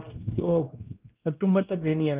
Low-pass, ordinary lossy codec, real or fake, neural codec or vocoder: 3.6 kHz; Opus, 24 kbps; fake; codec, 16 kHz, 1 kbps, X-Codec, WavLM features, trained on Multilingual LibriSpeech